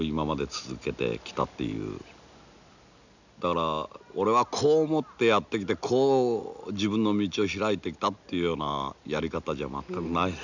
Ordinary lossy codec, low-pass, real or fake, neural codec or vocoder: none; 7.2 kHz; real; none